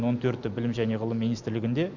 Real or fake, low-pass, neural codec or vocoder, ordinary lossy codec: real; 7.2 kHz; none; none